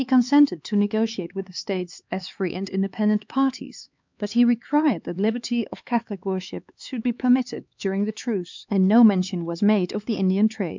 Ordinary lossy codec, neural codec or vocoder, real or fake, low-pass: MP3, 64 kbps; codec, 16 kHz, 4 kbps, X-Codec, HuBERT features, trained on LibriSpeech; fake; 7.2 kHz